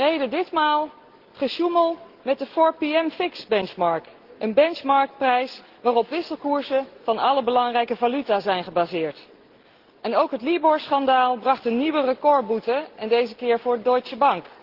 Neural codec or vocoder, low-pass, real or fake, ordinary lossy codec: none; 5.4 kHz; real; Opus, 16 kbps